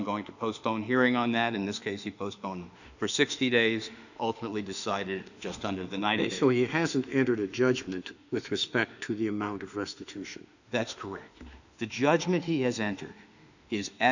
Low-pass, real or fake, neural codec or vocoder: 7.2 kHz; fake; autoencoder, 48 kHz, 32 numbers a frame, DAC-VAE, trained on Japanese speech